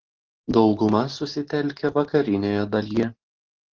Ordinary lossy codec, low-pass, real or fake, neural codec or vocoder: Opus, 16 kbps; 7.2 kHz; real; none